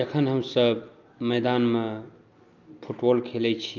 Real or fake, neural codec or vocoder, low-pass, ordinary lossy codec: real; none; 7.2 kHz; Opus, 24 kbps